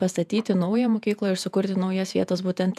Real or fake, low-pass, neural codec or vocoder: fake; 14.4 kHz; vocoder, 48 kHz, 128 mel bands, Vocos